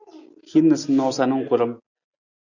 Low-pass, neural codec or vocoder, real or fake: 7.2 kHz; none; real